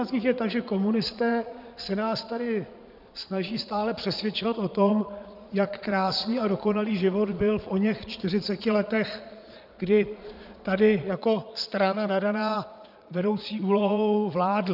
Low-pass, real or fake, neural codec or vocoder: 5.4 kHz; fake; vocoder, 22.05 kHz, 80 mel bands, Vocos